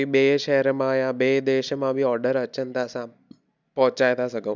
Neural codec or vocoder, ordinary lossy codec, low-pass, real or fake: none; none; 7.2 kHz; real